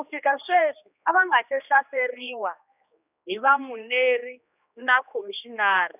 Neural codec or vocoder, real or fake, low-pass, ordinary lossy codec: codec, 16 kHz, 2 kbps, X-Codec, HuBERT features, trained on general audio; fake; 3.6 kHz; none